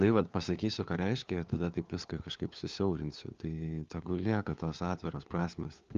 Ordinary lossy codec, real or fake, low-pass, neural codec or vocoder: Opus, 32 kbps; fake; 7.2 kHz; codec, 16 kHz, 4 kbps, FunCodec, trained on LibriTTS, 50 frames a second